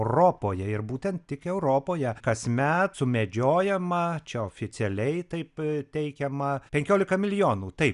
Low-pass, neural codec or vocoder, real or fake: 10.8 kHz; none; real